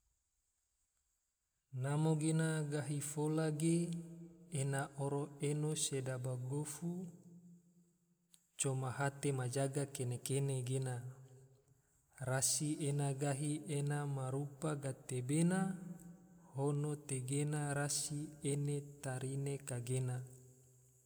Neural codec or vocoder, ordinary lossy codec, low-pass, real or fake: none; none; none; real